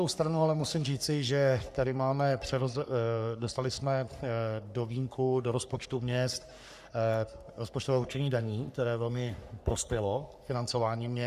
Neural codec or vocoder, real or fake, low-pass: codec, 44.1 kHz, 3.4 kbps, Pupu-Codec; fake; 14.4 kHz